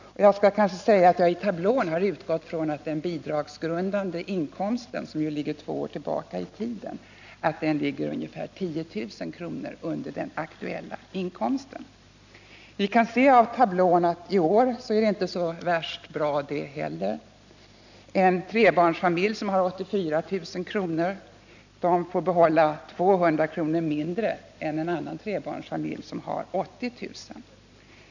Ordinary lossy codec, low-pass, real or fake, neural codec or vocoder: none; 7.2 kHz; real; none